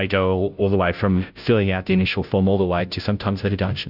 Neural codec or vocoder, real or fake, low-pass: codec, 16 kHz, 0.5 kbps, FunCodec, trained on Chinese and English, 25 frames a second; fake; 5.4 kHz